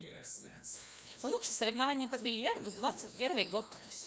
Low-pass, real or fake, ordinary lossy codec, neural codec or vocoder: none; fake; none; codec, 16 kHz, 1 kbps, FreqCodec, larger model